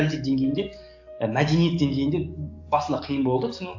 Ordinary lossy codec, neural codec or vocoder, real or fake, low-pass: AAC, 48 kbps; codec, 44.1 kHz, 7.8 kbps, DAC; fake; 7.2 kHz